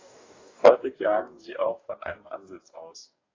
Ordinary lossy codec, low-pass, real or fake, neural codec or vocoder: AAC, 32 kbps; 7.2 kHz; fake; codec, 44.1 kHz, 2.6 kbps, DAC